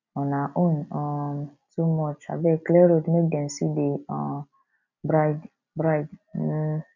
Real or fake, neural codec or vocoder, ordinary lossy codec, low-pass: real; none; none; 7.2 kHz